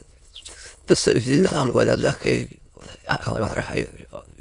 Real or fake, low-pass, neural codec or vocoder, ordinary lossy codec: fake; 9.9 kHz; autoencoder, 22.05 kHz, a latent of 192 numbers a frame, VITS, trained on many speakers; Opus, 64 kbps